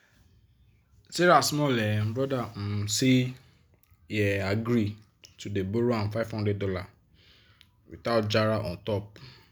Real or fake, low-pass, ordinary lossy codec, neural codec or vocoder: real; none; none; none